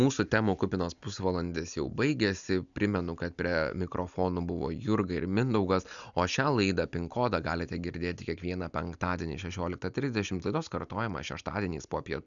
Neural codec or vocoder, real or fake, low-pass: none; real; 7.2 kHz